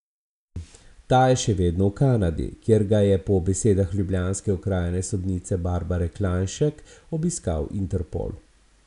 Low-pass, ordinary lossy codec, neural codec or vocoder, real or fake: 10.8 kHz; none; none; real